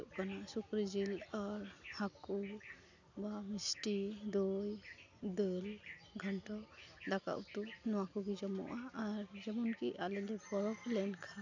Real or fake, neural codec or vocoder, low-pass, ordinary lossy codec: real; none; 7.2 kHz; none